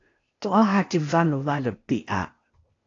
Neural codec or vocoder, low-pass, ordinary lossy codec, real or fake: codec, 16 kHz, 0.8 kbps, ZipCodec; 7.2 kHz; AAC, 32 kbps; fake